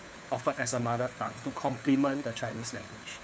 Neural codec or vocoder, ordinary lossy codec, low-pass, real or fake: codec, 16 kHz, 4 kbps, FunCodec, trained on LibriTTS, 50 frames a second; none; none; fake